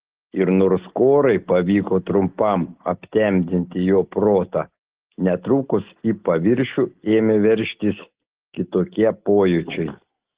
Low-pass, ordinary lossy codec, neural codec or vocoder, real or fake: 3.6 kHz; Opus, 16 kbps; none; real